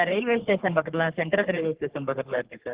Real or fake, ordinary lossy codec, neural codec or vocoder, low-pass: fake; Opus, 16 kbps; codec, 44.1 kHz, 3.4 kbps, Pupu-Codec; 3.6 kHz